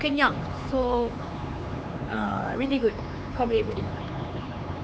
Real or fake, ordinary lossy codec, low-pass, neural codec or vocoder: fake; none; none; codec, 16 kHz, 4 kbps, X-Codec, HuBERT features, trained on LibriSpeech